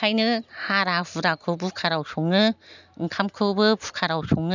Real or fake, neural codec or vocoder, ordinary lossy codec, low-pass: real; none; none; 7.2 kHz